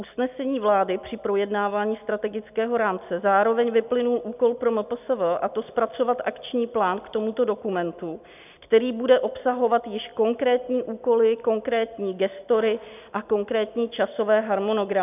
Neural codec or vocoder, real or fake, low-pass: none; real; 3.6 kHz